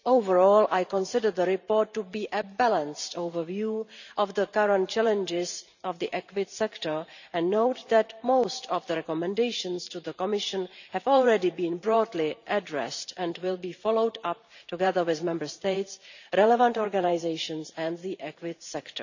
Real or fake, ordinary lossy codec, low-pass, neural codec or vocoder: fake; none; 7.2 kHz; vocoder, 44.1 kHz, 128 mel bands every 512 samples, BigVGAN v2